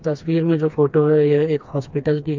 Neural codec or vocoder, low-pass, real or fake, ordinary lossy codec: codec, 16 kHz, 2 kbps, FreqCodec, smaller model; 7.2 kHz; fake; MP3, 64 kbps